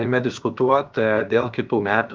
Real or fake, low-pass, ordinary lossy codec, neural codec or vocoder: fake; 7.2 kHz; Opus, 32 kbps; codec, 16 kHz, about 1 kbps, DyCAST, with the encoder's durations